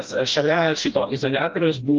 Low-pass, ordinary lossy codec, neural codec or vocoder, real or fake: 7.2 kHz; Opus, 32 kbps; codec, 16 kHz, 1 kbps, FreqCodec, smaller model; fake